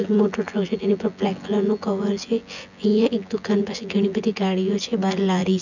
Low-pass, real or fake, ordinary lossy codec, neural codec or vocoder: 7.2 kHz; fake; none; vocoder, 24 kHz, 100 mel bands, Vocos